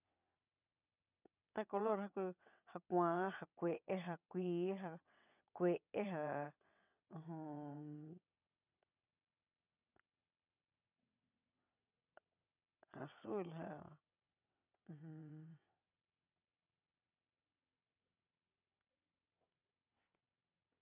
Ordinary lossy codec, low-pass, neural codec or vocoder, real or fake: none; 3.6 kHz; vocoder, 22.05 kHz, 80 mel bands, WaveNeXt; fake